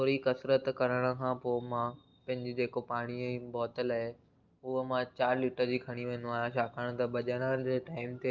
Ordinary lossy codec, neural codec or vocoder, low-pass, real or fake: Opus, 24 kbps; none; 7.2 kHz; real